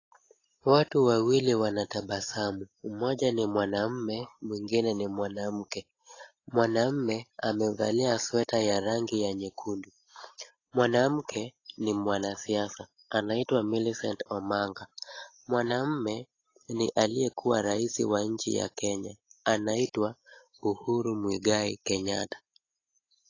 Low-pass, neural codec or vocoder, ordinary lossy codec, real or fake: 7.2 kHz; none; AAC, 32 kbps; real